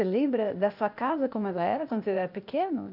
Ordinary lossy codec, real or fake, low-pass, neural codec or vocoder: none; fake; 5.4 kHz; codec, 16 kHz, 0.8 kbps, ZipCodec